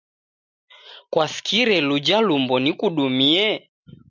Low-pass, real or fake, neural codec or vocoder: 7.2 kHz; real; none